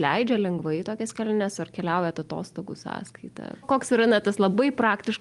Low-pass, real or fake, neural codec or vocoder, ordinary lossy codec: 10.8 kHz; real; none; Opus, 32 kbps